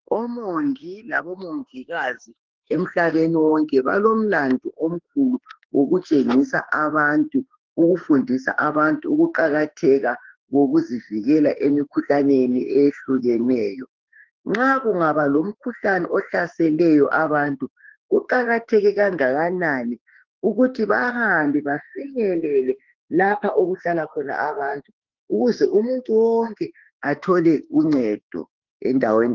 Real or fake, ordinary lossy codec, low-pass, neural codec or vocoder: fake; Opus, 16 kbps; 7.2 kHz; autoencoder, 48 kHz, 32 numbers a frame, DAC-VAE, trained on Japanese speech